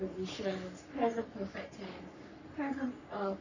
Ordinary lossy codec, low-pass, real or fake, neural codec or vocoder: none; 7.2 kHz; fake; codec, 44.1 kHz, 3.4 kbps, Pupu-Codec